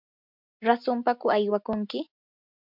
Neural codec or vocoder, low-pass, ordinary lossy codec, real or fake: none; 5.4 kHz; MP3, 48 kbps; real